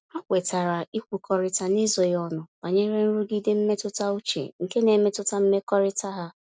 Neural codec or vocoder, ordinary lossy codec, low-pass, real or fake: none; none; none; real